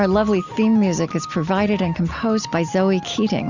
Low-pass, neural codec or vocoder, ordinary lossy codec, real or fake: 7.2 kHz; none; Opus, 64 kbps; real